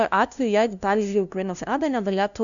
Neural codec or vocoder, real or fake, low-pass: codec, 16 kHz, 0.5 kbps, FunCodec, trained on LibriTTS, 25 frames a second; fake; 7.2 kHz